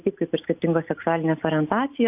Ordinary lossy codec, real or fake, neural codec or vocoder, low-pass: AAC, 32 kbps; real; none; 3.6 kHz